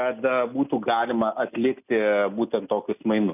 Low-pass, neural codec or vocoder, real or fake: 3.6 kHz; none; real